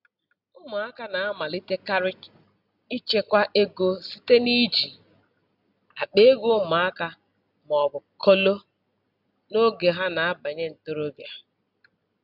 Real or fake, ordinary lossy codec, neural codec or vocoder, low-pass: real; none; none; 5.4 kHz